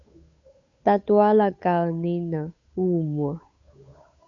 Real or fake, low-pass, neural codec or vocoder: fake; 7.2 kHz; codec, 16 kHz, 8 kbps, FunCodec, trained on Chinese and English, 25 frames a second